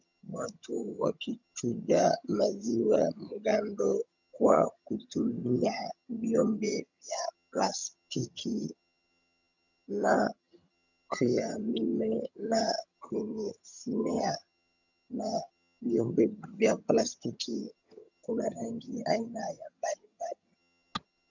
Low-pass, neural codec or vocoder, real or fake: 7.2 kHz; vocoder, 22.05 kHz, 80 mel bands, HiFi-GAN; fake